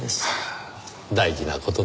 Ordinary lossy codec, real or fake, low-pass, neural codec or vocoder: none; real; none; none